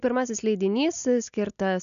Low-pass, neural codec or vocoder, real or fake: 7.2 kHz; none; real